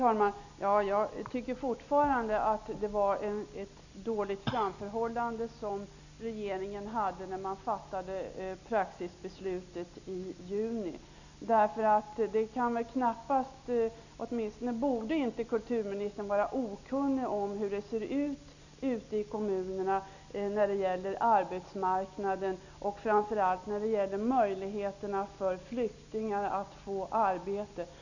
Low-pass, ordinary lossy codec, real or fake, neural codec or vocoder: 7.2 kHz; none; real; none